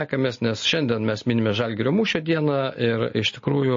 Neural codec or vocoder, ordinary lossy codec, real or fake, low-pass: none; MP3, 32 kbps; real; 7.2 kHz